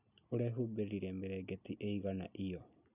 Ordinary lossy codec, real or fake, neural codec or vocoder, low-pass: none; real; none; 3.6 kHz